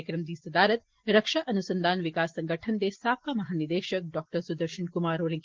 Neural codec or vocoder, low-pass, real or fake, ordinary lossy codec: none; 7.2 kHz; real; Opus, 16 kbps